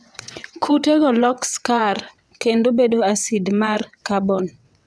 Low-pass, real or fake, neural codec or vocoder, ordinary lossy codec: none; fake; vocoder, 22.05 kHz, 80 mel bands, WaveNeXt; none